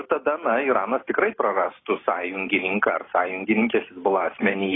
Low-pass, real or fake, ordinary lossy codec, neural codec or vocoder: 7.2 kHz; real; AAC, 16 kbps; none